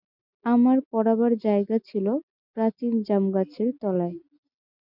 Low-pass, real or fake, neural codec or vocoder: 5.4 kHz; real; none